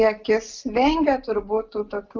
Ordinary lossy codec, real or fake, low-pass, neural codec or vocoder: Opus, 32 kbps; real; 7.2 kHz; none